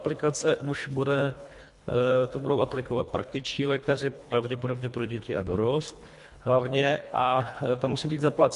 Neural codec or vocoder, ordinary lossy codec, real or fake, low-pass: codec, 24 kHz, 1.5 kbps, HILCodec; MP3, 64 kbps; fake; 10.8 kHz